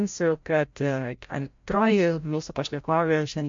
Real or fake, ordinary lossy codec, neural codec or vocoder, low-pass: fake; MP3, 48 kbps; codec, 16 kHz, 0.5 kbps, FreqCodec, larger model; 7.2 kHz